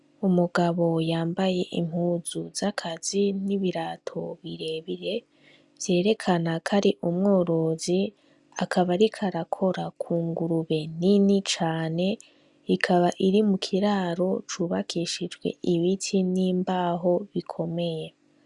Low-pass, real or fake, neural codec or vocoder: 10.8 kHz; real; none